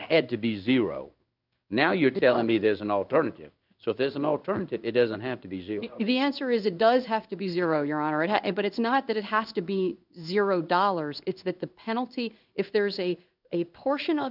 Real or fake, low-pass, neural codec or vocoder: fake; 5.4 kHz; codec, 16 kHz in and 24 kHz out, 1 kbps, XY-Tokenizer